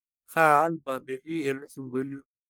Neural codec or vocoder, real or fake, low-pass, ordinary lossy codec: codec, 44.1 kHz, 1.7 kbps, Pupu-Codec; fake; none; none